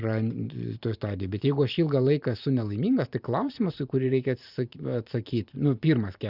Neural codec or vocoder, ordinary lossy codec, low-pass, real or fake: vocoder, 44.1 kHz, 128 mel bands every 512 samples, BigVGAN v2; Opus, 64 kbps; 5.4 kHz; fake